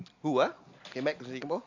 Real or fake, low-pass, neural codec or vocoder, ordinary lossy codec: real; 7.2 kHz; none; AAC, 48 kbps